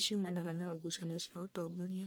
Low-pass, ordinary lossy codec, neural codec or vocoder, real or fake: none; none; codec, 44.1 kHz, 1.7 kbps, Pupu-Codec; fake